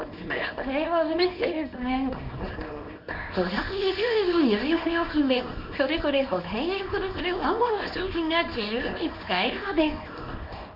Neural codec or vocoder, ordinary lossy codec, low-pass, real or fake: codec, 24 kHz, 0.9 kbps, WavTokenizer, small release; none; 5.4 kHz; fake